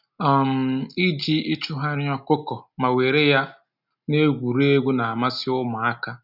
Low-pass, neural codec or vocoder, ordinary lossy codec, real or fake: 5.4 kHz; none; none; real